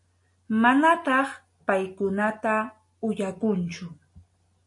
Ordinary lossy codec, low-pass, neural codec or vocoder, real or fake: MP3, 48 kbps; 10.8 kHz; none; real